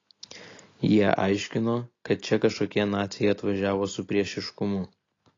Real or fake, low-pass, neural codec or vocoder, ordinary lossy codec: real; 7.2 kHz; none; AAC, 32 kbps